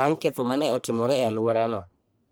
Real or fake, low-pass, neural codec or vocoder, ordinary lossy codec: fake; none; codec, 44.1 kHz, 1.7 kbps, Pupu-Codec; none